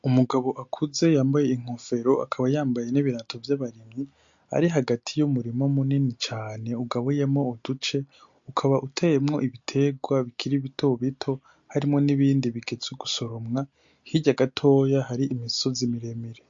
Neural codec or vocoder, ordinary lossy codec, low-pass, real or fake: none; MP3, 48 kbps; 7.2 kHz; real